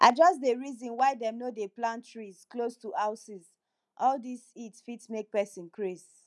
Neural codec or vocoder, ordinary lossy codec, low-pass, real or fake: none; none; none; real